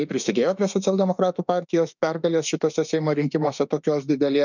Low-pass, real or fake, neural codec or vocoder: 7.2 kHz; fake; autoencoder, 48 kHz, 32 numbers a frame, DAC-VAE, trained on Japanese speech